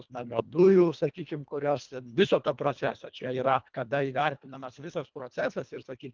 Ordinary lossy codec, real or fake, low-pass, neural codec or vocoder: Opus, 24 kbps; fake; 7.2 kHz; codec, 24 kHz, 1.5 kbps, HILCodec